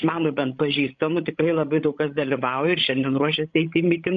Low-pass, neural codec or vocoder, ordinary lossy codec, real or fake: 7.2 kHz; codec, 16 kHz, 16 kbps, FreqCodec, larger model; MP3, 64 kbps; fake